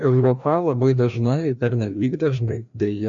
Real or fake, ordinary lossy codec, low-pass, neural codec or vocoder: fake; MP3, 64 kbps; 7.2 kHz; codec, 16 kHz, 1 kbps, FreqCodec, larger model